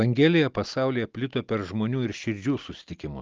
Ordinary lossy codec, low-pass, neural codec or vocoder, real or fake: Opus, 24 kbps; 7.2 kHz; none; real